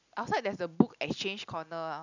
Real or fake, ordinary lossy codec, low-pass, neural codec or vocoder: real; none; 7.2 kHz; none